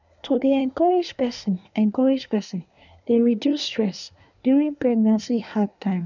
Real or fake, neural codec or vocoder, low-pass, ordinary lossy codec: fake; codec, 24 kHz, 1 kbps, SNAC; 7.2 kHz; none